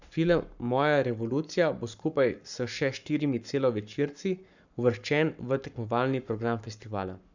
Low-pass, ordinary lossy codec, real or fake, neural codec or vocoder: 7.2 kHz; none; fake; codec, 44.1 kHz, 7.8 kbps, Pupu-Codec